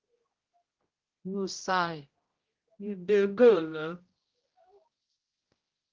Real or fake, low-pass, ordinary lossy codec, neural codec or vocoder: fake; 7.2 kHz; Opus, 16 kbps; codec, 16 kHz, 0.5 kbps, X-Codec, HuBERT features, trained on general audio